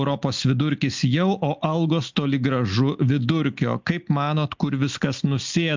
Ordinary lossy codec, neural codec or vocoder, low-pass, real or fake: MP3, 64 kbps; none; 7.2 kHz; real